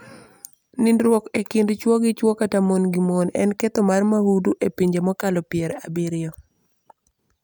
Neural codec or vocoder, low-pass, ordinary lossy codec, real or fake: none; none; none; real